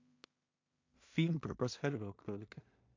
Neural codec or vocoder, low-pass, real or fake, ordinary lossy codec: codec, 16 kHz in and 24 kHz out, 0.4 kbps, LongCat-Audio-Codec, two codebook decoder; 7.2 kHz; fake; MP3, 48 kbps